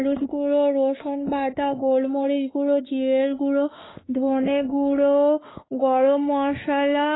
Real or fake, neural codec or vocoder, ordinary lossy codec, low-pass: fake; autoencoder, 48 kHz, 32 numbers a frame, DAC-VAE, trained on Japanese speech; AAC, 16 kbps; 7.2 kHz